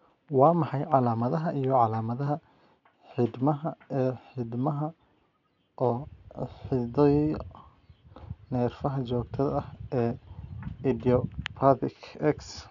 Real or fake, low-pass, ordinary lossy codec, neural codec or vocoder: real; 7.2 kHz; none; none